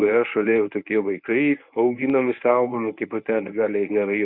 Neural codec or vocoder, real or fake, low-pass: codec, 24 kHz, 0.9 kbps, WavTokenizer, medium speech release version 1; fake; 5.4 kHz